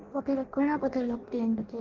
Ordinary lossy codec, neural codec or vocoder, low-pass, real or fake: Opus, 16 kbps; codec, 16 kHz in and 24 kHz out, 0.6 kbps, FireRedTTS-2 codec; 7.2 kHz; fake